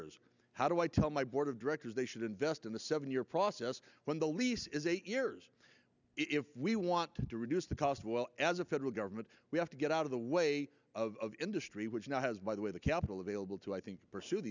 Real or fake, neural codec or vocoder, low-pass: real; none; 7.2 kHz